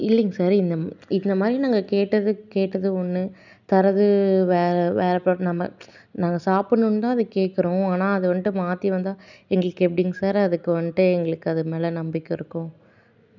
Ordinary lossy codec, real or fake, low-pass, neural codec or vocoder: none; real; 7.2 kHz; none